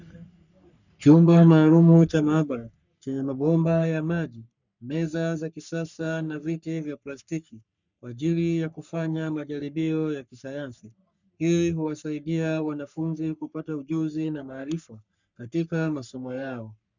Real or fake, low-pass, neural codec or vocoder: fake; 7.2 kHz; codec, 44.1 kHz, 3.4 kbps, Pupu-Codec